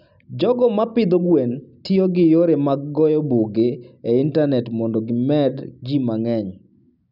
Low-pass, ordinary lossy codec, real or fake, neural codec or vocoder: 5.4 kHz; none; real; none